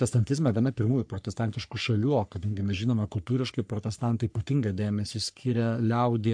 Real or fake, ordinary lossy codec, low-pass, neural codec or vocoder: fake; MP3, 64 kbps; 9.9 kHz; codec, 44.1 kHz, 3.4 kbps, Pupu-Codec